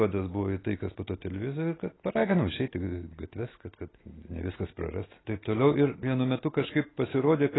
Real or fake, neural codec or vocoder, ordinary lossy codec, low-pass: real; none; AAC, 16 kbps; 7.2 kHz